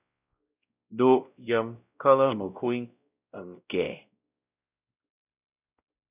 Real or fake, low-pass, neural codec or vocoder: fake; 3.6 kHz; codec, 16 kHz, 0.5 kbps, X-Codec, WavLM features, trained on Multilingual LibriSpeech